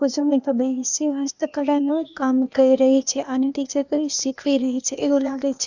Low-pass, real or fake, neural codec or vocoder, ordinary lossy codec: 7.2 kHz; fake; codec, 16 kHz, 0.8 kbps, ZipCodec; none